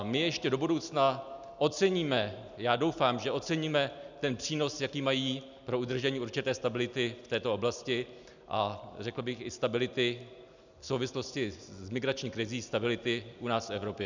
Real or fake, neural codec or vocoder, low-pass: real; none; 7.2 kHz